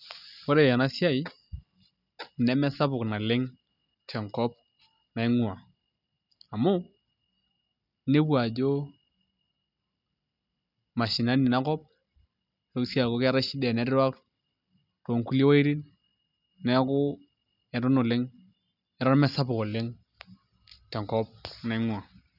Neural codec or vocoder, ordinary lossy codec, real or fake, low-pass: none; none; real; 5.4 kHz